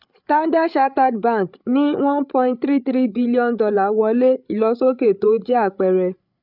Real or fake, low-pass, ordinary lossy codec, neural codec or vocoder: fake; 5.4 kHz; none; codec, 16 kHz, 8 kbps, FreqCodec, larger model